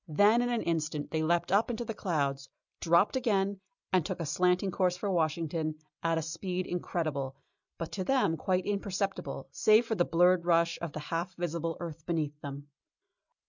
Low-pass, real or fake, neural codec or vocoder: 7.2 kHz; real; none